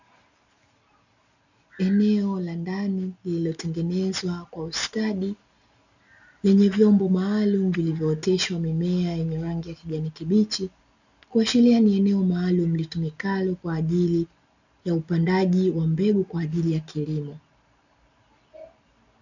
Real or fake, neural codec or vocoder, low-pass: real; none; 7.2 kHz